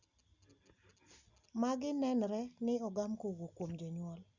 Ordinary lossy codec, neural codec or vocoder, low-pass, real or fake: Opus, 64 kbps; none; 7.2 kHz; real